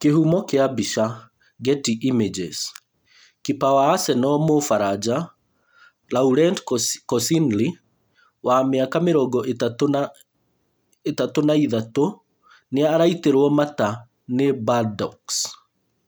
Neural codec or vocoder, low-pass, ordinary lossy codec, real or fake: none; none; none; real